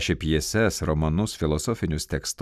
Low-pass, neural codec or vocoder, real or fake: 14.4 kHz; none; real